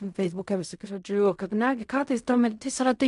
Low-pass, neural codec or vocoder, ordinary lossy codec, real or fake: 10.8 kHz; codec, 16 kHz in and 24 kHz out, 0.4 kbps, LongCat-Audio-Codec, fine tuned four codebook decoder; MP3, 64 kbps; fake